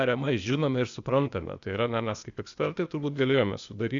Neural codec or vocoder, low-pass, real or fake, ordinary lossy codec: codec, 16 kHz, 0.8 kbps, ZipCodec; 7.2 kHz; fake; Opus, 64 kbps